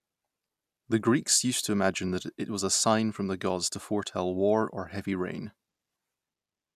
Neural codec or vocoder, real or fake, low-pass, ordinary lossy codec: none; real; 14.4 kHz; none